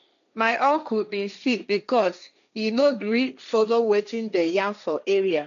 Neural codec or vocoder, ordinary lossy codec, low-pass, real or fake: codec, 16 kHz, 1.1 kbps, Voila-Tokenizer; MP3, 96 kbps; 7.2 kHz; fake